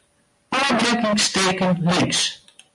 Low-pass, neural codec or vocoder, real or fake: 10.8 kHz; none; real